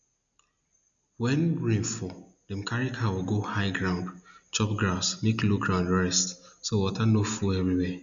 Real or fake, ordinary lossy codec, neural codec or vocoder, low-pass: real; none; none; 7.2 kHz